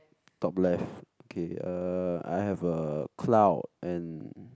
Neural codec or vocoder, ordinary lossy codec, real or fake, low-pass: none; none; real; none